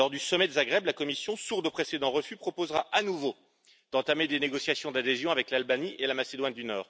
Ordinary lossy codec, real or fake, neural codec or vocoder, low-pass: none; real; none; none